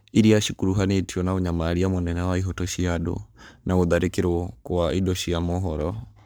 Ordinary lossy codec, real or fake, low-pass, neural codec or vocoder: none; fake; none; codec, 44.1 kHz, 7.8 kbps, Pupu-Codec